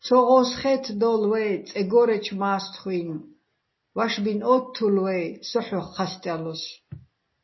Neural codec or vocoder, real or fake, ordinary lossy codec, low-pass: none; real; MP3, 24 kbps; 7.2 kHz